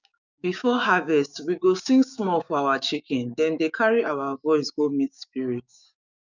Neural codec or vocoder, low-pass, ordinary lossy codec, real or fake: codec, 16 kHz, 6 kbps, DAC; 7.2 kHz; none; fake